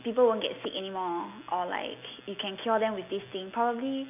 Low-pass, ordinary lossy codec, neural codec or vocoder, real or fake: 3.6 kHz; none; none; real